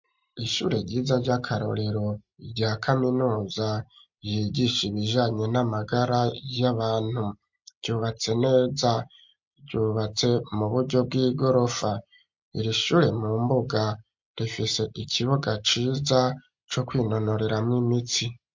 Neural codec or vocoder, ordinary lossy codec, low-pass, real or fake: none; MP3, 48 kbps; 7.2 kHz; real